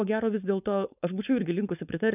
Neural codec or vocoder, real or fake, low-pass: codec, 16 kHz, 4.8 kbps, FACodec; fake; 3.6 kHz